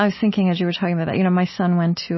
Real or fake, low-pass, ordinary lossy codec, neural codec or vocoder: real; 7.2 kHz; MP3, 24 kbps; none